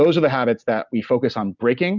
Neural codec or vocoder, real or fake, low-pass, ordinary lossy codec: none; real; 7.2 kHz; Opus, 64 kbps